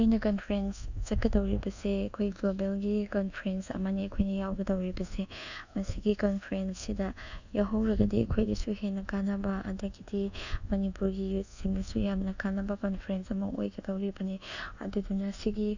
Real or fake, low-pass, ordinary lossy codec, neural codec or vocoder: fake; 7.2 kHz; none; codec, 24 kHz, 1.2 kbps, DualCodec